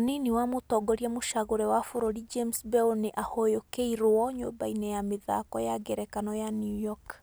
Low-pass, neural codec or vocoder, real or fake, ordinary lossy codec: none; none; real; none